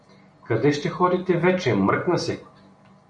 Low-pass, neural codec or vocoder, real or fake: 9.9 kHz; none; real